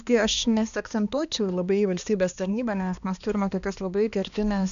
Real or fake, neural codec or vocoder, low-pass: fake; codec, 16 kHz, 2 kbps, X-Codec, HuBERT features, trained on balanced general audio; 7.2 kHz